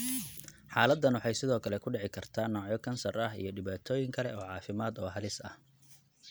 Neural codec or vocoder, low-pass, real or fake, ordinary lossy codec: none; none; real; none